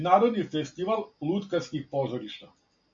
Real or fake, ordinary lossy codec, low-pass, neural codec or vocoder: real; MP3, 48 kbps; 7.2 kHz; none